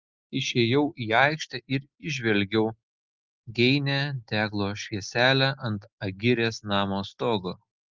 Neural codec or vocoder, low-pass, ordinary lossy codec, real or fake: none; 7.2 kHz; Opus, 32 kbps; real